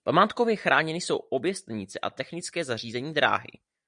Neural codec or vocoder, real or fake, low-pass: none; real; 9.9 kHz